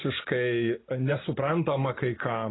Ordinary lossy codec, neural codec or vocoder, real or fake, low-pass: AAC, 16 kbps; none; real; 7.2 kHz